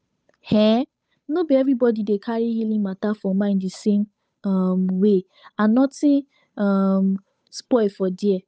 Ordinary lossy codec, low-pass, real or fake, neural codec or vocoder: none; none; fake; codec, 16 kHz, 8 kbps, FunCodec, trained on Chinese and English, 25 frames a second